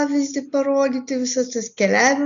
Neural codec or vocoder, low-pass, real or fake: none; 7.2 kHz; real